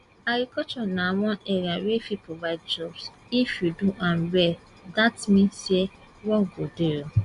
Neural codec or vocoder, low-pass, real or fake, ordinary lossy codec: none; 10.8 kHz; real; Opus, 64 kbps